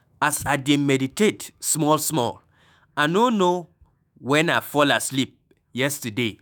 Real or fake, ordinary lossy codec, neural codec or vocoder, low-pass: fake; none; autoencoder, 48 kHz, 128 numbers a frame, DAC-VAE, trained on Japanese speech; none